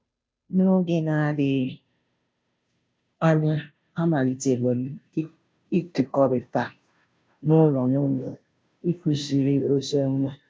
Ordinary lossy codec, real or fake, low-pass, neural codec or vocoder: none; fake; none; codec, 16 kHz, 0.5 kbps, FunCodec, trained on Chinese and English, 25 frames a second